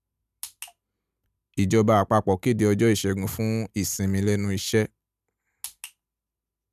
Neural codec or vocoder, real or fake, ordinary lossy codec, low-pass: none; real; none; 14.4 kHz